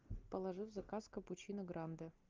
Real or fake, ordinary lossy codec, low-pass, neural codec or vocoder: real; Opus, 24 kbps; 7.2 kHz; none